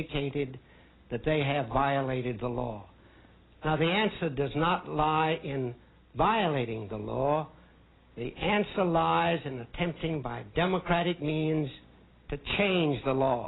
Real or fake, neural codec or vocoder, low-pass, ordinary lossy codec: real; none; 7.2 kHz; AAC, 16 kbps